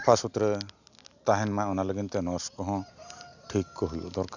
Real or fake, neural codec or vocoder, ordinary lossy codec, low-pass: real; none; none; 7.2 kHz